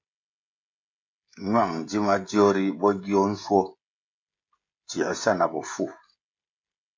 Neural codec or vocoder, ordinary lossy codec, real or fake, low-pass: codec, 16 kHz, 16 kbps, FreqCodec, smaller model; MP3, 48 kbps; fake; 7.2 kHz